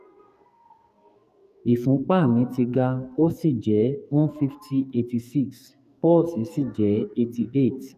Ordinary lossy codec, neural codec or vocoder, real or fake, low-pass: none; codec, 44.1 kHz, 2.6 kbps, SNAC; fake; 14.4 kHz